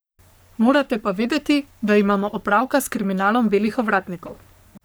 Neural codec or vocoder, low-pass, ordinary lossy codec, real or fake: codec, 44.1 kHz, 3.4 kbps, Pupu-Codec; none; none; fake